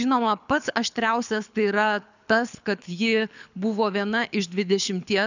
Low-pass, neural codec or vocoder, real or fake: 7.2 kHz; codec, 24 kHz, 6 kbps, HILCodec; fake